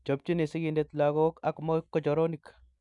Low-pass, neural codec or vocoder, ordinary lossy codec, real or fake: 10.8 kHz; none; none; real